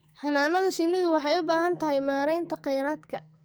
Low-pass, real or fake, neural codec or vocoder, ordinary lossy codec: none; fake; codec, 44.1 kHz, 2.6 kbps, SNAC; none